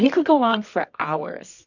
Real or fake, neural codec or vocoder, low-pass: fake; codec, 24 kHz, 0.9 kbps, WavTokenizer, medium music audio release; 7.2 kHz